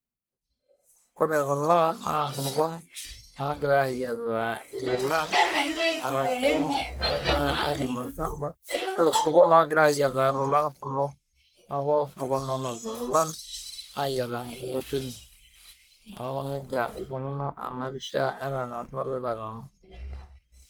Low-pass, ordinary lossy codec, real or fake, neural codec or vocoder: none; none; fake; codec, 44.1 kHz, 1.7 kbps, Pupu-Codec